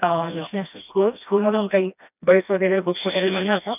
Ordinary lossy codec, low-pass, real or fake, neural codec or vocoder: none; 3.6 kHz; fake; codec, 16 kHz, 1 kbps, FreqCodec, smaller model